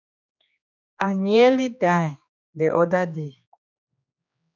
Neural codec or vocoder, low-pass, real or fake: codec, 16 kHz, 2 kbps, X-Codec, HuBERT features, trained on general audio; 7.2 kHz; fake